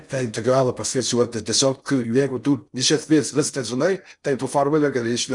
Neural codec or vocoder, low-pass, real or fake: codec, 16 kHz in and 24 kHz out, 0.6 kbps, FocalCodec, streaming, 4096 codes; 10.8 kHz; fake